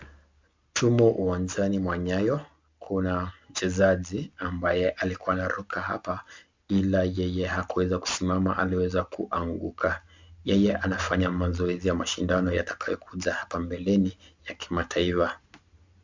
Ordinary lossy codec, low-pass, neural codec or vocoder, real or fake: MP3, 64 kbps; 7.2 kHz; none; real